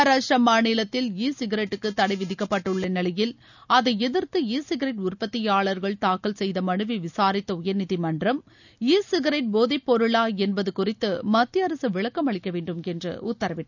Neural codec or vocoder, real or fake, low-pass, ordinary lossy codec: none; real; 7.2 kHz; none